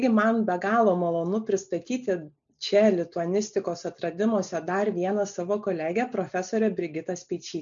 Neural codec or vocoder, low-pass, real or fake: none; 7.2 kHz; real